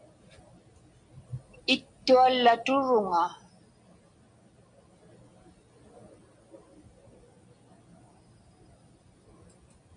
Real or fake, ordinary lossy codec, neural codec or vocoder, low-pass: real; MP3, 64 kbps; none; 9.9 kHz